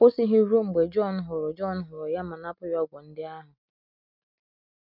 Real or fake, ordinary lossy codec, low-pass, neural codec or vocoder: real; none; 5.4 kHz; none